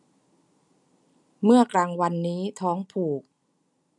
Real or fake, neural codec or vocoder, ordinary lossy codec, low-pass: real; none; none; 10.8 kHz